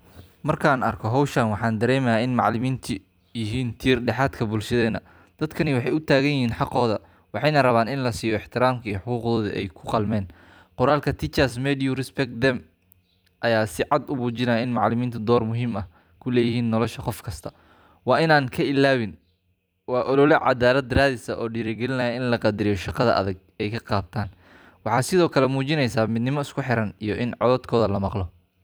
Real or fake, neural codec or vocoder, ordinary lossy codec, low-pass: fake; vocoder, 44.1 kHz, 128 mel bands every 256 samples, BigVGAN v2; none; none